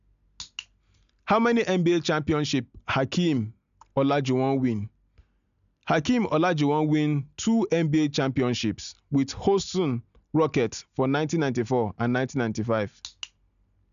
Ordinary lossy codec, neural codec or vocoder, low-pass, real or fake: MP3, 96 kbps; none; 7.2 kHz; real